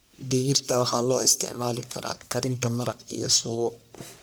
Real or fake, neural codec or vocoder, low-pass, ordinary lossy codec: fake; codec, 44.1 kHz, 1.7 kbps, Pupu-Codec; none; none